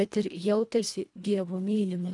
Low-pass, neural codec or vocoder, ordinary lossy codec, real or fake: 10.8 kHz; codec, 24 kHz, 1.5 kbps, HILCodec; AAC, 64 kbps; fake